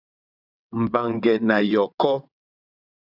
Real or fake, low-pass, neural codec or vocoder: fake; 5.4 kHz; vocoder, 22.05 kHz, 80 mel bands, WaveNeXt